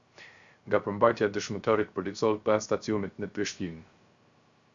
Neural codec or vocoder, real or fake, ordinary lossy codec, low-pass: codec, 16 kHz, 0.3 kbps, FocalCodec; fake; Opus, 64 kbps; 7.2 kHz